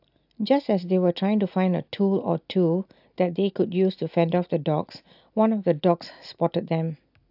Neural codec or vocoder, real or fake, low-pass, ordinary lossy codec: none; real; 5.4 kHz; AAC, 48 kbps